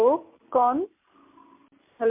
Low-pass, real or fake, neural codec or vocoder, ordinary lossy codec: 3.6 kHz; real; none; MP3, 32 kbps